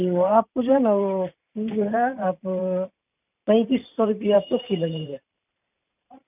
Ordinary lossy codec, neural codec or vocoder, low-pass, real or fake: none; vocoder, 44.1 kHz, 128 mel bands every 512 samples, BigVGAN v2; 3.6 kHz; fake